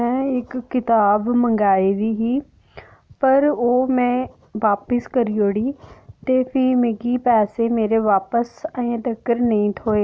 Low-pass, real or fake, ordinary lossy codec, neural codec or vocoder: none; real; none; none